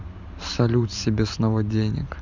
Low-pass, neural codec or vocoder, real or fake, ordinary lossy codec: 7.2 kHz; vocoder, 44.1 kHz, 128 mel bands every 512 samples, BigVGAN v2; fake; none